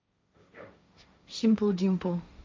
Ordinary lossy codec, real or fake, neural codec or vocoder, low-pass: none; fake; codec, 16 kHz, 1.1 kbps, Voila-Tokenizer; none